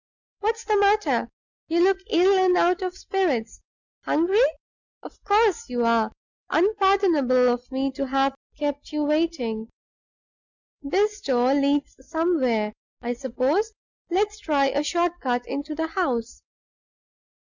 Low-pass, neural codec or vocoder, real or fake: 7.2 kHz; none; real